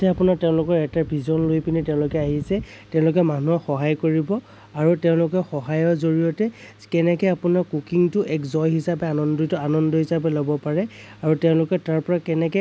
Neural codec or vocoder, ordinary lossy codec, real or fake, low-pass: none; none; real; none